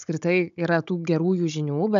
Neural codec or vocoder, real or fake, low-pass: codec, 16 kHz, 16 kbps, FunCodec, trained on Chinese and English, 50 frames a second; fake; 7.2 kHz